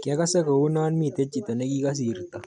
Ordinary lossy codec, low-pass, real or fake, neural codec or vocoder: none; 9.9 kHz; real; none